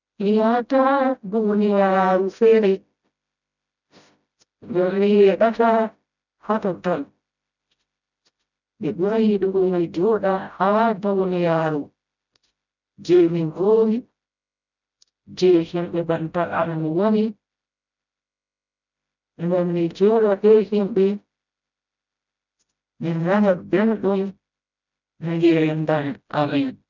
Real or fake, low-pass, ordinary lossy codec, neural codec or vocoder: fake; 7.2 kHz; none; codec, 16 kHz, 0.5 kbps, FreqCodec, smaller model